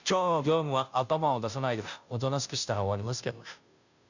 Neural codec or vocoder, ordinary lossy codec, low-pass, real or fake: codec, 16 kHz, 0.5 kbps, FunCodec, trained on Chinese and English, 25 frames a second; none; 7.2 kHz; fake